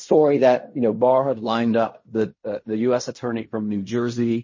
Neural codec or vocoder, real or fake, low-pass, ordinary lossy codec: codec, 16 kHz in and 24 kHz out, 0.4 kbps, LongCat-Audio-Codec, fine tuned four codebook decoder; fake; 7.2 kHz; MP3, 32 kbps